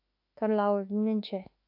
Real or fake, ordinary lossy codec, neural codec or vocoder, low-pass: fake; AAC, 32 kbps; autoencoder, 48 kHz, 32 numbers a frame, DAC-VAE, trained on Japanese speech; 5.4 kHz